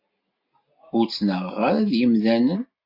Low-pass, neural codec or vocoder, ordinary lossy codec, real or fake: 5.4 kHz; none; AAC, 32 kbps; real